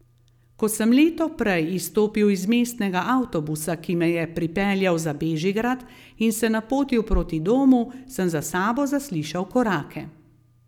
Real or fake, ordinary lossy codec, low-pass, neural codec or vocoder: real; none; 19.8 kHz; none